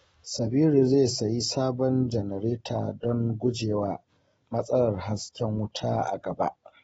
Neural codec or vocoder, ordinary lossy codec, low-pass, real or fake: none; AAC, 24 kbps; 19.8 kHz; real